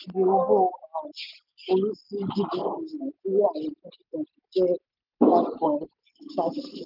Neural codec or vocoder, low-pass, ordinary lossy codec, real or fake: none; 5.4 kHz; none; real